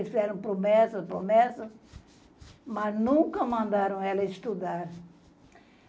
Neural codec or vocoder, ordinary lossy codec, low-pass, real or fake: none; none; none; real